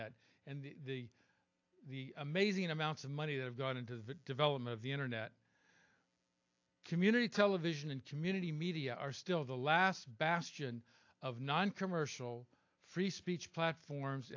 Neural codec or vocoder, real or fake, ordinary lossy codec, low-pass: none; real; AAC, 48 kbps; 7.2 kHz